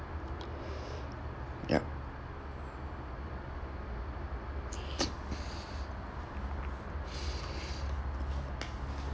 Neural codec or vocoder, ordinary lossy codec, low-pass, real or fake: none; none; none; real